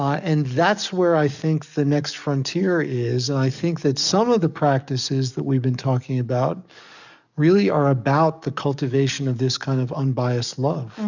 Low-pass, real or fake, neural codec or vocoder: 7.2 kHz; fake; codec, 44.1 kHz, 7.8 kbps, DAC